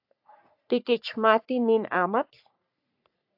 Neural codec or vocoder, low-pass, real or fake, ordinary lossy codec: codec, 44.1 kHz, 3.4 kbps, Pupu-Codec; 5.4 kHz; fake; AAC, 48 kbps